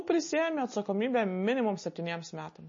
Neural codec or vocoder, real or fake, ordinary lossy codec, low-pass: none; real; MP3, 32 kbps; 7.2 kHz